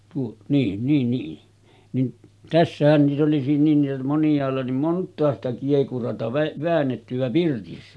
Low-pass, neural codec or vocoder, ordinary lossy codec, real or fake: none; none; none; real